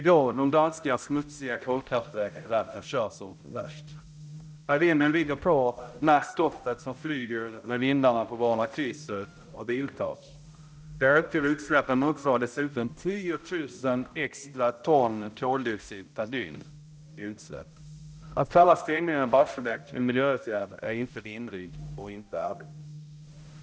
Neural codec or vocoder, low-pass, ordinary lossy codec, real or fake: codec, 16 kHz, 0.5 kbps, X-Codec, HuBERT features, trained on balanced general audio; none; none; fake